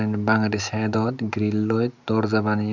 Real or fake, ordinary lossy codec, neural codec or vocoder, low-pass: real; none; none; 7.2 kHz